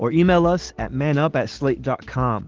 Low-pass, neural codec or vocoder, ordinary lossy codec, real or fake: 7.2 kHz; none; Opus, 16 kbps; real